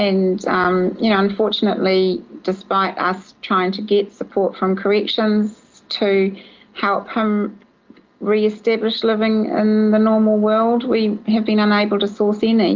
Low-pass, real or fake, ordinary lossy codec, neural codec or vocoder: 7.2 kHz; real; Opus, 24 kbps; none